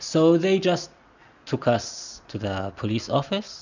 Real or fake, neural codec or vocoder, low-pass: real; none; 7.2 kHz